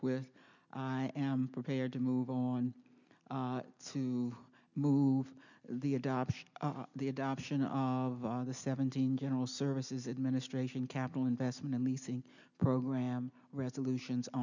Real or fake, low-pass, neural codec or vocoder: real; 7.2 kHz; none